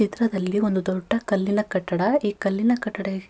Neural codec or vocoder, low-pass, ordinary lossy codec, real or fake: none; none; none; real